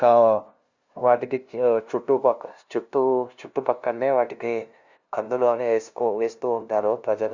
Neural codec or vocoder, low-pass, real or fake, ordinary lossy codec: codec, 16 kHz, 0.5 kbps, FunCodec, trained on LibriTTS, 25 frames a second; 7.2 kHz; fake; Opus, 64 kbps